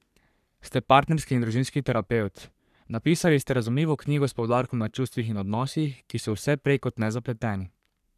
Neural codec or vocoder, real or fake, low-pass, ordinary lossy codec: codec, 44.1 kHz, 3.4 kbps, Pupu-Codec; fake; 14.4 kHz; none